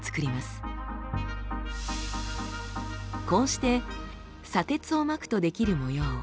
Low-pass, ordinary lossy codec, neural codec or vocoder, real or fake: none; none; none; real